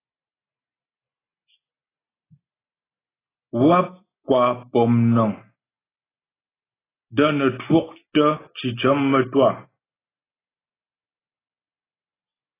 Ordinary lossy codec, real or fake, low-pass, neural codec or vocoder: AAC, 16 kbps; real; 3.6 kHz; none